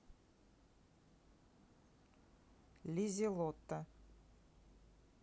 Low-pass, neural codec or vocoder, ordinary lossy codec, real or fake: none; none; none; real